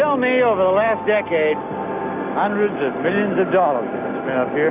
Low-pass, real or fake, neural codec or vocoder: 3.6 kHz; real; none